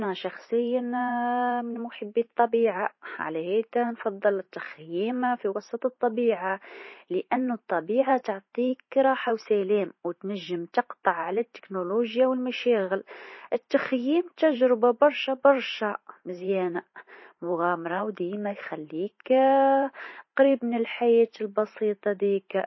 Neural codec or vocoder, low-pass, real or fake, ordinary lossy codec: vocoder, 44.1 kHz, 128 mel bands every 512 samples, BigVGAN v2; 7.2 kHz; fake; MP3, 24 kbps